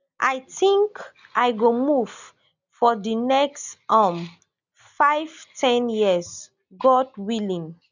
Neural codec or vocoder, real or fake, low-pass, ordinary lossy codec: none; real; 7.2 kHz; none